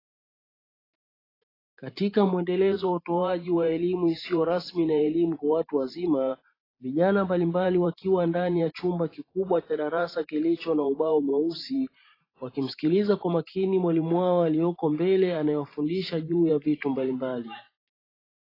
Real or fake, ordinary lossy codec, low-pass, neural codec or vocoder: fake; AAC, 24 kbps; 5.4 kHz; vocoder, 44.1 kHz, 128 mel bands every 512 samples, BigVGAN v2